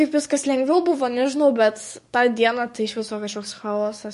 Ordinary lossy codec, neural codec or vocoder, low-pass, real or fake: MP3, 48 kbps; codec, 44.1 kHz, 7.8 kbps, DAC; 14.4 kHz; fake